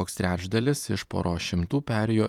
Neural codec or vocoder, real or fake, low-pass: vocoder, 48 kHz, 128 mel bands, Vocos; fake; 19.8 kHz